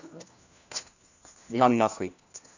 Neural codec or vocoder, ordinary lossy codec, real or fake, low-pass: codec, 16 kHz, 1 kbps, FunCodec, trained on LibriTTS, 50 frames a second; none; fake; 7.2 kHz